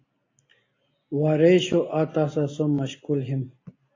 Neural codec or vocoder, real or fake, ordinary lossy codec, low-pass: none; real; AAC, 32 kbps; 7.2 kHz